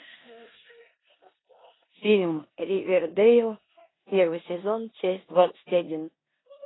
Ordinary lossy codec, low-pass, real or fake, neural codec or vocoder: AAC, 16 kbps; 7.2 kHz; fake; codec, 16 kHz in and 24 kHz out, 0.9 kbps, LongCat-Audio-Codec, four codebook decoder